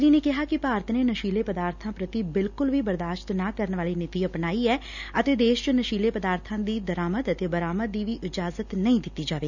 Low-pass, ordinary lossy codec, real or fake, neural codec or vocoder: 7.2 kHz; none; real; none